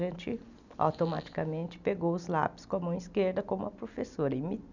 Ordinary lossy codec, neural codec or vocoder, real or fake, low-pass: none; none; real; 7.2 kHz